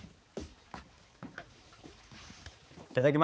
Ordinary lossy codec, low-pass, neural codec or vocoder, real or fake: none; none; codec, 16 kHz, 4 kbps, X-Codec, HuBERT features, trained on balanced general audio; fake